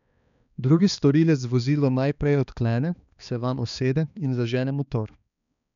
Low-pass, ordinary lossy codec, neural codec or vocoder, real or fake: 7.2 kHz; none; codec, 16 kHz, 2 kbps, X-Codec, HuBERT features, trained on balanced general audio; fake